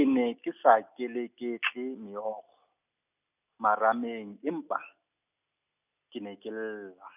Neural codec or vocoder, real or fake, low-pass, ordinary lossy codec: none; real; 3.6 kHz; none